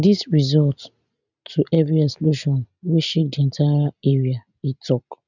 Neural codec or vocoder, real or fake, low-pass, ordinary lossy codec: none; real; 7.2 kHz; none